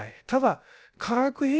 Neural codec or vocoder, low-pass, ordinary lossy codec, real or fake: codec, 16 kHz, about 1 kbps, DyCAST, with the encoder's durations; none; none; fake